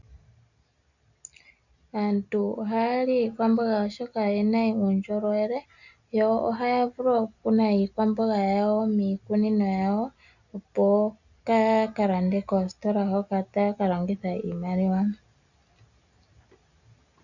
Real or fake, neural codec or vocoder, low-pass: real; none; 7.2 kHz